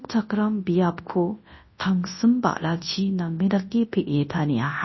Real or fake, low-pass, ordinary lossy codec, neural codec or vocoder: fake; 7.2 kHz; MP3, 24 kbps; codec, 24 kHz, 0.9 kbps, WavTokenizer, large speech release